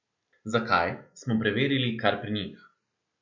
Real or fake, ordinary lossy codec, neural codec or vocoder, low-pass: real; none; none; 7.2 kHz